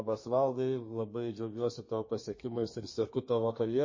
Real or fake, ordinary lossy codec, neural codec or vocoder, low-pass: fake; MP3, 32 kbps; codec, 32 kHz, 1.9 kbps, SNAC; 7.2 kHz